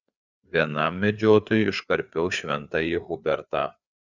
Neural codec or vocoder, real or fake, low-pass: codec, 16 kHz, 4 kbps, FreqCodec, larger model; fake; 7.2 kHz